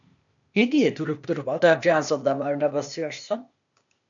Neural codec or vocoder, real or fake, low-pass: codec, 16 kHz, 0.8 kbps, ZipCodec; fake; 7.2 kHz